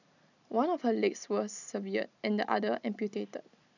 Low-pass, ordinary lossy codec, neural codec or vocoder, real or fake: 7.2 kHz; none; none; real